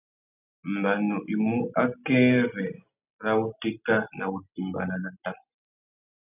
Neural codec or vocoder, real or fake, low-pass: none; real; 3.6 kHz